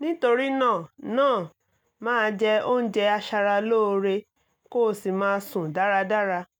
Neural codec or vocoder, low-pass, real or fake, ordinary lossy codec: none; 19.8 kHz; real; none